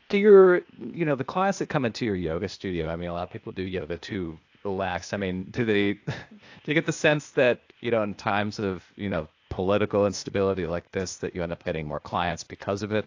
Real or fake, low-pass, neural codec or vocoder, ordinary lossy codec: fake; 7.2 kHz; codec, 16 kHz, 0.8 kbps, ZipCodec; AAC, 48 kbps